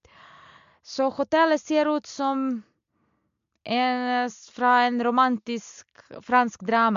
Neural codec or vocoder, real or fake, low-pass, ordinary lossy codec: none; real; 7.2 kHz; MP3, 64 kbps